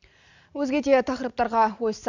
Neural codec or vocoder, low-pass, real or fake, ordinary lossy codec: none; 7.2 kHz; real; none